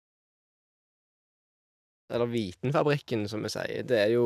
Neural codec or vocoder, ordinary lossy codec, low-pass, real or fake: none; none; 14.4 kHz; real